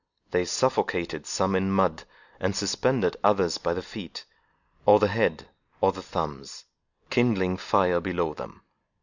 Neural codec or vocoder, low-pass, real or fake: none; 7.2 kHz; real